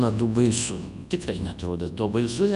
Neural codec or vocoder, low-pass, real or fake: codec, 24 kHz, 0.9 kbps, WavTokenizer, large speech release; 10.8 kHz; fake